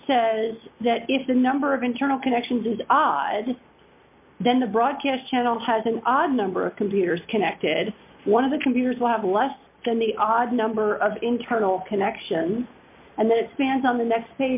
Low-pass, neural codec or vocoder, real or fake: 3.6 kHz; none; real